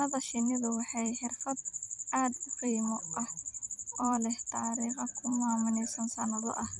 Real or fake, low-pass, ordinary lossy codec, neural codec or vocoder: fake; 10.8 kHz; none; vocoder, 44.1 kHz, 128 mel bands every 256 samples, BigVGAN v2